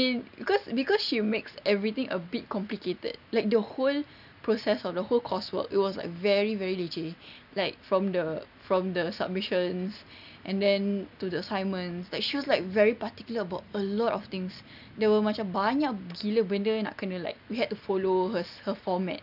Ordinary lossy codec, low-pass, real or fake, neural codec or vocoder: none; 5.4 kHz; real; none